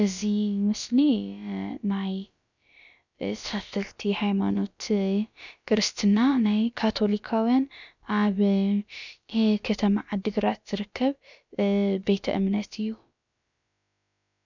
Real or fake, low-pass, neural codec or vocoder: fake; 7.2 kHz; codec, 16 kHz, about 1 kbps, DyCAST, with the encoder's durations